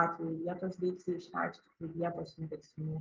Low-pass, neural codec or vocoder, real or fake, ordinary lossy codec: 7.2 kHz; none; real; Opus, 32 kbps